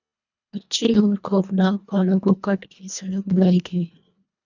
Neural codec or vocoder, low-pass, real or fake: codec, 24 kHz, 1.5 kbps, HILCodec; 7.2 kHz; fake